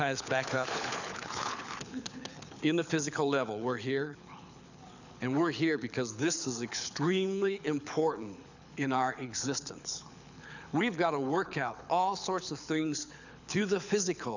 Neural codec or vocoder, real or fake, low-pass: codec, 24 kHz, 6 kbps, HILCodec; fake; 7.2 kHz